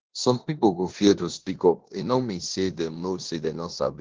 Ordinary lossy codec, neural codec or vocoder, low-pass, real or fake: Opus, 16 kbps; codec, 16 kHz in and 24 kHz out, 0.9 kbps, LongCat-Audio-Codec, four codebook decoder; 7.2 kHz; fake